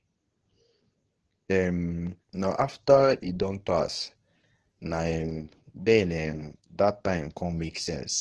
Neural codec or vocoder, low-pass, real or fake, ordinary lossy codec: codec, 24 kHz, 0.9 kbps, WavTokenizer, medium speech release version 2; 10.8 kHz; fake; Opus, 16 kbps